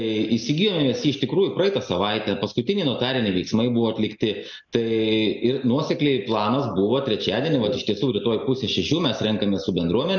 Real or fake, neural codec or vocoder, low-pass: real; none; 7.2 kHz